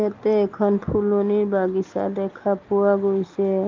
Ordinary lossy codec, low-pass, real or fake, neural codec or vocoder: Opus, 24 kbps; 7.2 kHz; real; none